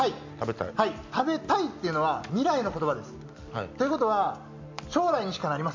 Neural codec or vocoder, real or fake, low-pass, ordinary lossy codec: none; real; 7.2 kHz; MP3, 64 kbps